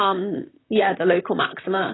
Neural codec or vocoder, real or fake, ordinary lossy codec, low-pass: vocoder, 44.1 kHz, 128 mel bands, Pupu-Vocoder; fake; AAC, 16 kbps; 7.2 kHz